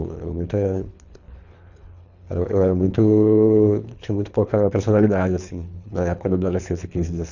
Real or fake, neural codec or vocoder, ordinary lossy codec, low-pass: fake; codec, 24 kHz, 3 kbps, HILCodec; none; 7.2 kHz